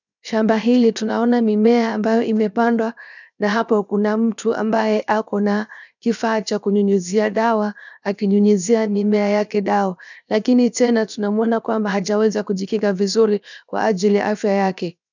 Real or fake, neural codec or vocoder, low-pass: fake; codec, 16 kHz, 0.7 kbps, FocalCodec; 7.2 kHz